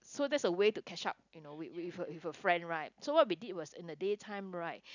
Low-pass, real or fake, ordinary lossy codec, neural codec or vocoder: 7.2 kHz; real; none; none